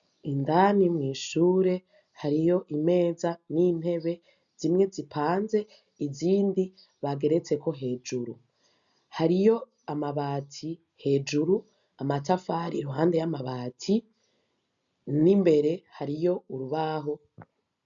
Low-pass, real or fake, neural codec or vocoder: 7.2 kHz; real; none